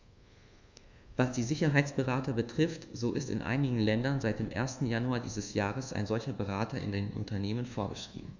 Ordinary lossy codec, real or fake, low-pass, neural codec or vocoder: Opus, 64 kbps; fake; 7.2 kHz; codec, 24 kHz, 1.2 kbps, DualCodec